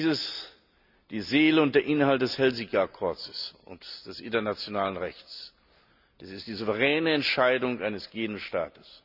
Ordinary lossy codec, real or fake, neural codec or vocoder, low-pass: none; real; none; 5.4 kHz